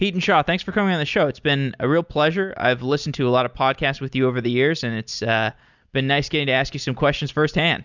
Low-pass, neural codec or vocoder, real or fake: 7.2 kHz; none; real